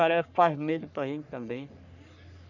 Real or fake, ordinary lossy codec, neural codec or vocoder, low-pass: fake; none; codec, 44.1 kHz, 3.4 kbps, Pupu-Codec; 7.2 kHz